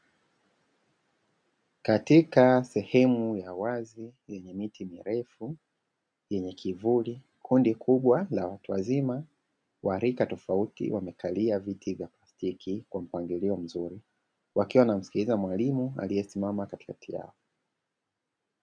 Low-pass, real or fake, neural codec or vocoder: 9.9 kHz; real; none